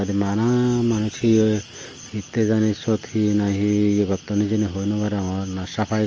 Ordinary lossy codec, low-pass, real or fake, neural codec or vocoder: Opus, 24 kbps; 7.2 kHz; real; none